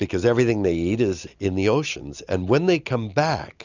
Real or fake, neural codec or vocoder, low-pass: real; none; 7.2 kHz